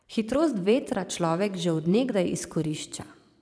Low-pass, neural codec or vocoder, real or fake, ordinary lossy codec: none; vocoder, 22.05 kHz, 80 mel bands, Vocos; fake; none